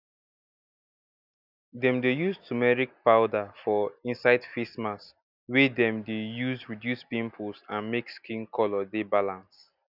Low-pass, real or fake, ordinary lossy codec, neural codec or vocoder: 5.4 kHz; real; none; none